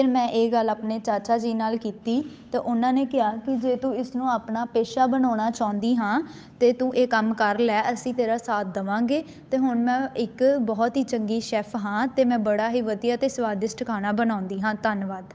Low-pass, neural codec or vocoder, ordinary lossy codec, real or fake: none; codec, 16 kHz, 8 kbps, FunCodec, trained on Chinese and English, 25 frames a second; none; fake